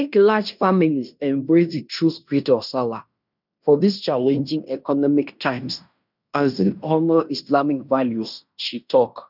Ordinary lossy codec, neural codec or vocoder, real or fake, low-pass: none; codec, 16 kHz in and 24 kHz out, 0.9 kbps, LongCat-Audio-Codec, fine tuned four codebook decoder; fake; 5.4 kHz